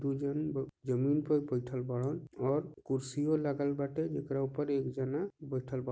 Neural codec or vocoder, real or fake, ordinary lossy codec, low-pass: none; real; none; none